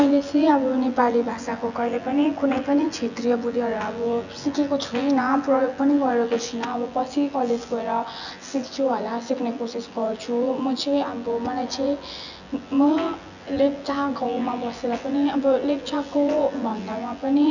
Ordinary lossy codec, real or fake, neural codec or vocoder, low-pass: none; fake; vocoder, 24 kHz, 100 mel bands, Vocos; 7.2 kHz